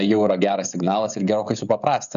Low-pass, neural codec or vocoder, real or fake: 7.2 kHz; codec, 16 kHz, 16 kbps, FreqCodec, smaller model; fake